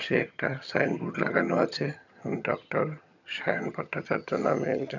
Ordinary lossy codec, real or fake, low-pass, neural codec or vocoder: none; fake; 7.2 kHz; vocoder, 22.05 kHz, 80 mel bands, HiFi-GAN